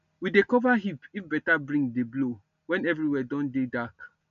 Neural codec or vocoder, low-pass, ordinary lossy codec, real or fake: none; 7.2 kHz; none; real